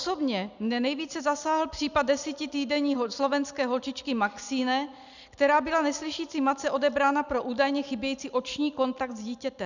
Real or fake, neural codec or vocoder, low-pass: real; none; 7.2 kHz